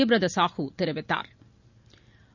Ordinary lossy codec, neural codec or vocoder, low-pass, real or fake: none; none; 7.2 kHz; real